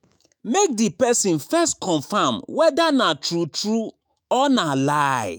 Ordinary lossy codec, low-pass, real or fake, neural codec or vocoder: none; none; fake; autoencoder, 48 kHz, 128 numbers a frame, DAC-VAE, trained on Japanese speech